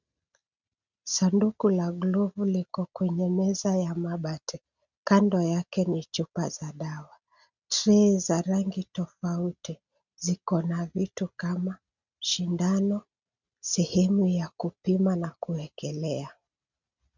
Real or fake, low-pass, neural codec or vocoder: real; 7.2 kHz; none